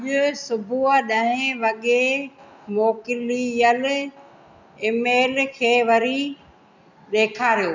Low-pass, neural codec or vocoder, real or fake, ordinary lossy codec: 7.2 kHz; none; real; none